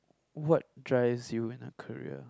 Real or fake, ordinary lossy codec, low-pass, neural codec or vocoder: real; none; none; none